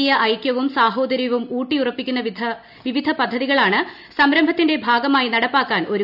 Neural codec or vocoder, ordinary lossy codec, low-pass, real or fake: none; none; 5.4 kHz; real